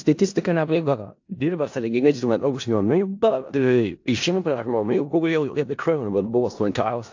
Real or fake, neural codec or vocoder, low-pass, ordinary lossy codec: fake; codec, 16 kHz in and 24 kHz out, 0.4 kbps, LongCat-Audio-Codec, four codebook decoder; 7.2 kHz; AAC, 48 kbps